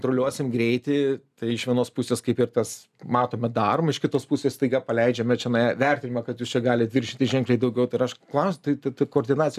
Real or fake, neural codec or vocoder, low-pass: real; none; 14.4 kHz